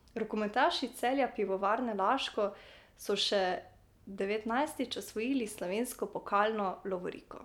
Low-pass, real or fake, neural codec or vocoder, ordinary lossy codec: 19.8 kHz; real; none; none